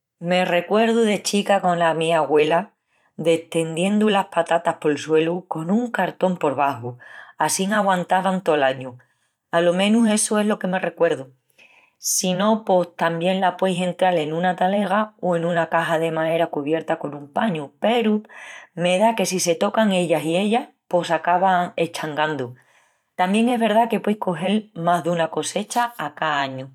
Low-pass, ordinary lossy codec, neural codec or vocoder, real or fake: 19.8 kHz; none; vocoder, 44.1 kHz, 128 mel bands every 512 samples, BigVGAN v2; fake